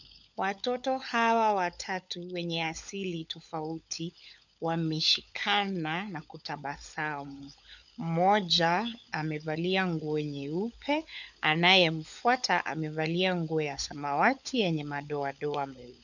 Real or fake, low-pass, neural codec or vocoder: fake; 7.2 kHz; codec, 16 kHz, 16 kbps, FunCodec, trained on LibriTTS, 50 frames a second